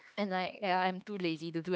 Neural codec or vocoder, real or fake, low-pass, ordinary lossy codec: codec, 16 kHz, 2 kbps, X-Codec, HuBERT features, trained on LibriSpeech; fake; none; none